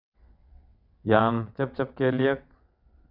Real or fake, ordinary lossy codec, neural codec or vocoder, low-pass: fake; none; vocoder, 22.05 kHz, 80 mel bands, WaveNeXt; 5.4 kHz